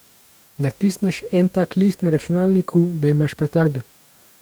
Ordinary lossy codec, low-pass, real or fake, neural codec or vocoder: none; none; fake; codec, 44.1 kHz, 2.6 kbps, DAC